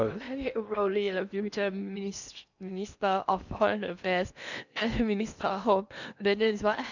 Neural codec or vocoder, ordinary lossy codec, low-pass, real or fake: codec, 16 kHz in and 24 kHz out, 0.8 kbps, FocalCodec, streaming, 65536 codes; none; 7.2 kHz; fake